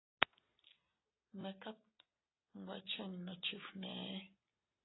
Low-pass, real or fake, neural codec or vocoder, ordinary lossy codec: 7.2 kHz; real; none; AAC, 16 kbps